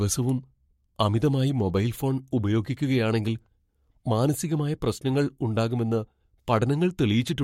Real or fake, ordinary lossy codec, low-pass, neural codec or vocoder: fake; MP3, 64 kbps; 19.8 kHz; codec, 44.1 kHz, 7.8 kbps, Pupu-Codec